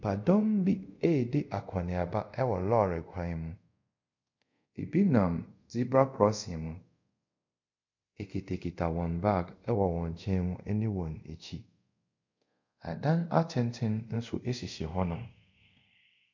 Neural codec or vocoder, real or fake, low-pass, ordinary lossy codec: codec, 24 kHz, 0.5 kbps, DualCodec; fake; 7.2 kHz; MP3, 64 kbps